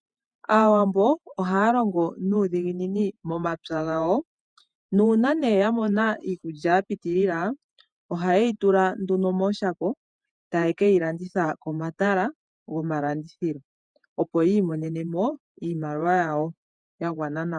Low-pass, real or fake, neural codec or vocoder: 9.9 kHz; fake; vocoder, 48 kHz, 128 mel bands, Vocos